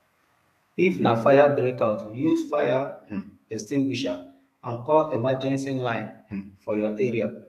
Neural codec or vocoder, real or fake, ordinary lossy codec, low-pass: codec, 32 kHz, 1.9 kbps, SNAC; fake; none; 14.4 kHz